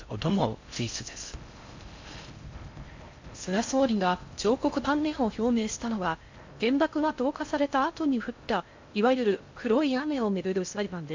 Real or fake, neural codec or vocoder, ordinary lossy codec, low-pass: fake; codec, 16 kHz in and 24 kHz out, 0.6 kbps, FocalCodec, streaming, 4096 codes; MP3, 64 kbps; 7.2 kHz